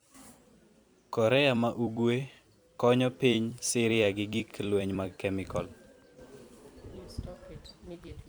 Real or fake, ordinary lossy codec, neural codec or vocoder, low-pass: fake; none; vocoder, 44.1 kHz, 128 mel bands every 256 samples, BigVGAN v2; none